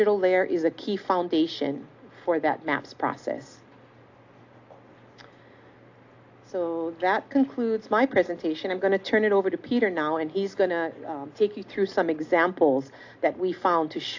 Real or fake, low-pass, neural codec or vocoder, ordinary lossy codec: real; 7.2 kHz; none; AAC, 48 kbps